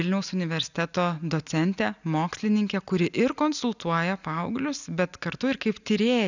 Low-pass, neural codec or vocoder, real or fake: 7.2 kHz; none; real